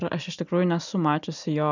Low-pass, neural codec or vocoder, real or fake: 7.2 kHz; none; real